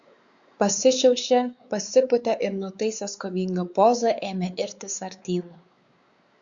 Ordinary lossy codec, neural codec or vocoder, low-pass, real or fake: Opus, 64 kbps; codec, 16 kHz, 4 kbps, X-Codec, WavLM features, trained on Multilingual LibriSpeech; 7.2 kHz; fake